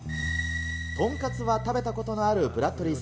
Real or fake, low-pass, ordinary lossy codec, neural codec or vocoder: real; none; none; none